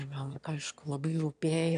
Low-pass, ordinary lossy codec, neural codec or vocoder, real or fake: 9.9 kHz; MP3, 96 kbps; autoencoder, 22.05 kHz, a latent of 192 numbers a frame, VITS, trained on one speaker; fake